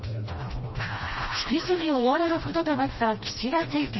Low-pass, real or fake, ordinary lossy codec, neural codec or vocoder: 7.2 kHz; fake; MP3, 24 kbps; codec, 16 kHz, 1 kbps, FreqCodec, smaller model